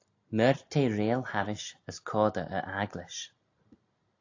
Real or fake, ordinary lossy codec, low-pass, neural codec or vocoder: real; AAC, 48 kbps; 7.2 kHz; none